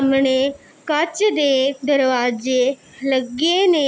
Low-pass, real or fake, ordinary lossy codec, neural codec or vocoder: none; real; none; none